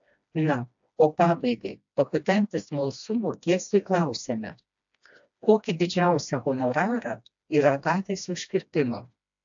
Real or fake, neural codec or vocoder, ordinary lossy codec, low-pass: fake; codec, 16 kHz, 1 kbps, FreqCodec, smaller model; AAC, 64 kbps; 7.2 kHz